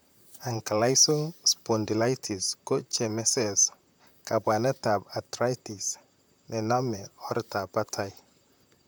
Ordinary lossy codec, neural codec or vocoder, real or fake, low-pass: none; vocoder, 44.1 kHz, 128 mel bands, Pupu-Vocoder; fake; none